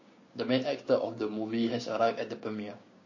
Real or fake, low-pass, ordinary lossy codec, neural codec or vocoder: fake; 7.2 kHz; MP3, 32 kbps; codec, 16 kHz, 6 kbps, DAC